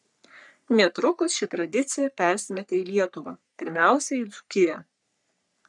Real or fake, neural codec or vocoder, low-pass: fake; codec, 44.1 kHz, 3.4 kbps, Pupu-Codec; 10.8 kHz